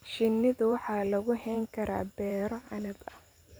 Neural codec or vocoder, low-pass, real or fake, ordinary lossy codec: vocoder, 44.1 kHz, 128 mel bands every 512 samples, BigVGAN v2; none; fake; none